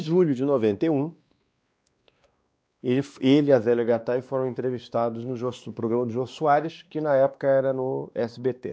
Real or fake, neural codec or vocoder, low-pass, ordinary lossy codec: fake; codec, 16 kHz, 2 kbps, X-Codec, WavLM features, trained on Multilingual LibriSpeech; none; none